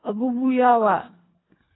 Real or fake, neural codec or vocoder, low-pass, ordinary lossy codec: fake; codec, 24 kHz, 1.5 kbps, HILCodec; 7.2 kHz; AAC, 16 kbps